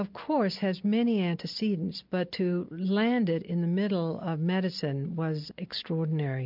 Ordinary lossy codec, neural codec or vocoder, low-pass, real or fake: MP3, 48 kbps; none; 5.4 kHz; real